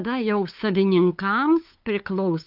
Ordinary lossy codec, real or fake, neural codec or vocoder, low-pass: Opus, 24 kbps; fake; codec, 16 kHz, 8 kbps, FunCodec, trained on LibriTTS, 25 frames a second; 5.4 kHz